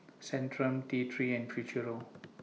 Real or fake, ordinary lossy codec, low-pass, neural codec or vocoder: real; none; none; none